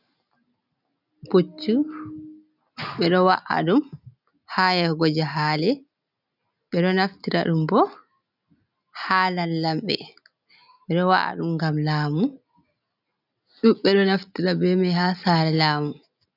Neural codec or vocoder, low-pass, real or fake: none; 5.4 kHz; real